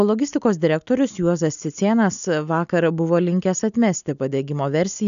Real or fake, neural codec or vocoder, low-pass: real; none; 7.2 kHz